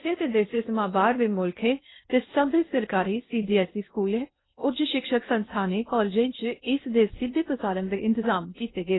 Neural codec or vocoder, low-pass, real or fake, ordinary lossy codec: codec, 16 kHz in and 24 kHz out, 0.6 kbps, FocalCodec, streaming, 2048 codes; 7.2 kHz; fake; AAC, 16 kbps